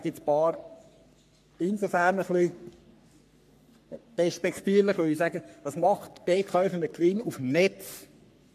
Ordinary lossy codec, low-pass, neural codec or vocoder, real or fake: MP3, 96 kbps; 14.4 kHz; codec, 44.1 kHz, 3.4 kbps, Pupu-Codec; fake